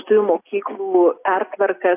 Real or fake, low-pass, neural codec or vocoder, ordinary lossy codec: real; 3.6 kHz; none; AAC, 24 kbps